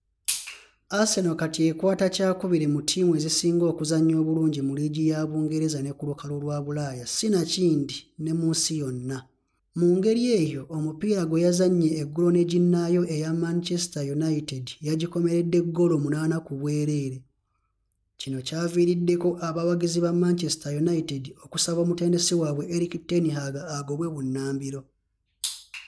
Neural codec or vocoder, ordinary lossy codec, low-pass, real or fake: none; none; none; real